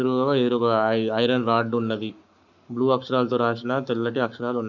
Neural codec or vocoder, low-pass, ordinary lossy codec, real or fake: codec, 44.1 kHz, 7.8 kbps, Pupu-Codec; 7.2 kHz; none; fake